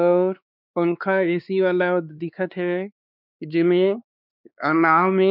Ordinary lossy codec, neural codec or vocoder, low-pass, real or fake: none; codec, 16 kHz, 2 kbps, X-Codec, WavLM features, trained on Multilingual LibriSpeech; 5.4 kHz; fake